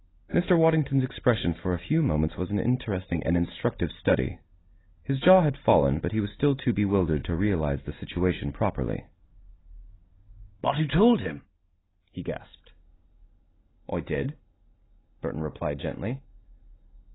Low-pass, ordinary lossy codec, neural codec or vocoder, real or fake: 7.2 kHz; AAC, 16 kbps; none; real